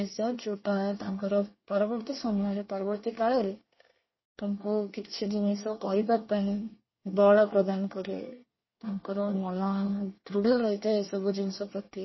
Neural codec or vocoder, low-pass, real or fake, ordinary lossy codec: codec, 24 kHz, 1 kbps, SNAC; 7.2 kHz; fake; MP3, 24 kbps